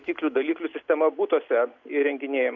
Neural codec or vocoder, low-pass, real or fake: none; 7.2 kHz; real